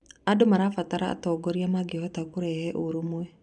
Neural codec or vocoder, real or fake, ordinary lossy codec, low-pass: vocoder, 44.1 kHz, 128 mel bands every 256 samples, BigVGAN v2; fake; none; 10.8 kHz